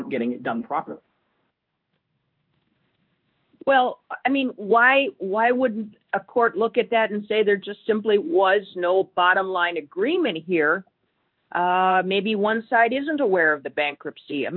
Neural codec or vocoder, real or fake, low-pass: codec, 16 kHz in and 24 kHz out, 1 kbps, XY-Tokenizer; fake; 5.4 kHz